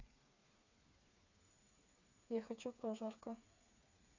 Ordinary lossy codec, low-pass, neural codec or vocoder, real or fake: none; 7.2 kHz; codec, 16 kHz, 4 kbps, FreqCodec, smaller model; fake